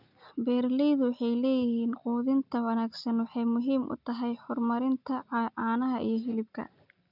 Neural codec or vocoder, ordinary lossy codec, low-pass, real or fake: none; none; 5.4 kHz; real